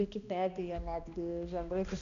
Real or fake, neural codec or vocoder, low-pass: fake; codec, 16 kHz, 1 kbps, X-Codec, HuBERT features, trained on balanced general audio; 7.2 kHz